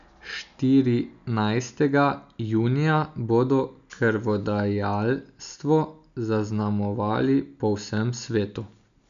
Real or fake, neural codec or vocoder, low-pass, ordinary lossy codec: real; none; 7.2 kHz; none